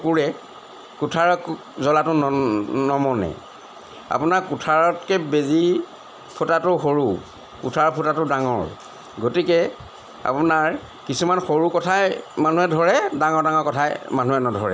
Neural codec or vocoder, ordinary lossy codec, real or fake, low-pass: none; none; real; none